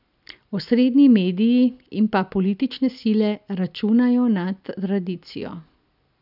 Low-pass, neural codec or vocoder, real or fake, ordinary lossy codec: 5.4 kHz; none; real; none